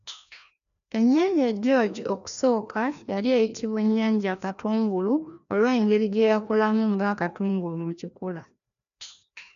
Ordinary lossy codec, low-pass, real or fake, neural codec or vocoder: none; 7.2 kHz; fake; codec, 16 kHz, 1 kbps, FreqCodec, larger model